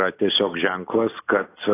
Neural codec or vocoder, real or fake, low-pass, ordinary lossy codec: none; real; 3.6 kHz; AAC, 16 kbps